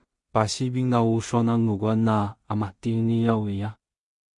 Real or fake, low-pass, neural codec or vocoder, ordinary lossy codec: fake; 10.8 kHz; codec, 16 kHz in and 24 kHz out, 0.4 kbps, LongCat-Audio-Codec, two codebook decoder; MP3, 48 kbps